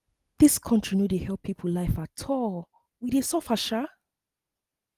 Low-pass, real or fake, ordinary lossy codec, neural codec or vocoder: 14.4 kHz; real; Opus, 24 kbps; none